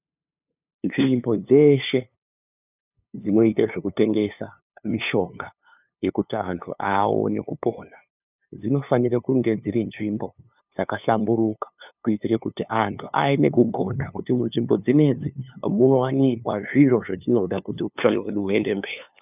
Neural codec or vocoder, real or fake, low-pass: codec, 16 kHz, 2 kbps, FunCodec, trained on LibriTTS, 25 frames a second; fake; 3.6 kHz